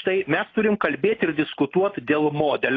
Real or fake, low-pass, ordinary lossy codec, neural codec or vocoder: real; 7.2 kHz; AAC, 32 kbps; none